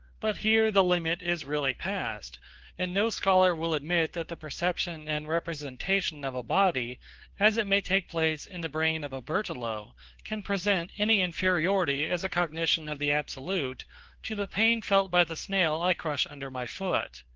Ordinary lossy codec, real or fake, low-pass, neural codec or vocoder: Opus, 16 kbps; fake; 7.2 kHz; codec, 16 kHz, 2 kbps, FunCodec, trained on Chinese and English, 25 frames a second